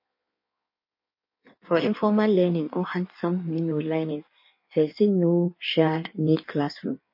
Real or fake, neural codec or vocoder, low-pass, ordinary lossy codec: fake; codec, 16 kHz in and 24 kHz out, 1.1 kbps, FireRedTTS-2 codec; 5.4 kHz; MP3, 32 kbps